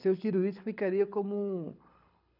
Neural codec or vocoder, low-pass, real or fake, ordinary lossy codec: codec, 24 kHz, 6 kbps, HILCodec; 5.4 kHz; fake; none